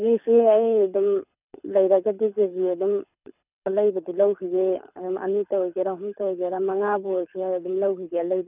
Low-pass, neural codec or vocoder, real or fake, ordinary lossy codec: 3.6 kHz; codec, 24 kHz, 6 kbps, HILCodec; fake; none